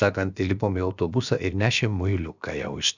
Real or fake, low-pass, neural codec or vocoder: fake; 7.2 kHz; codec, 16 kHz, about 1 kbps, DyCAST, with the encoder's durations